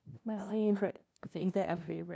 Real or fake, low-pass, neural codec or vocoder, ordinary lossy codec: fake; none; codec, 16 kHz, 0.5 kbps, FunCodec, trained on LibriTTS, 25 frames a second; none